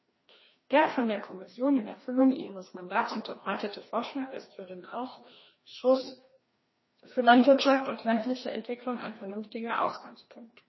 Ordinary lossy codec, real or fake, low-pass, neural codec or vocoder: MP3, 24 kbps; fake; 7.2 kHz; codec, 16 kHz, 1 kbps, FreqCodec, larger model